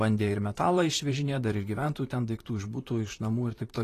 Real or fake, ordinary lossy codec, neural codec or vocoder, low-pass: fake; AAC, 48 kbps; vocoder, 44.1 kHz, 128 mel bands, Pupu-Vocoder; 14.4 kHz